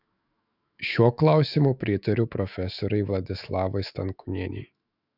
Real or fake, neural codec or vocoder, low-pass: fake; autoencoder, 48 kHz, 128 numbers a frame, DAC-VAE, trained on Japanese speech; 5.4 kHz